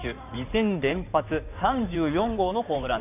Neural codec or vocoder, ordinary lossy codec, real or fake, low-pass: codec, 16 kHz in and 24 kHz out, 2.2 kbps, FireRedTTS-2 codec; none; fake; 3.6 kHz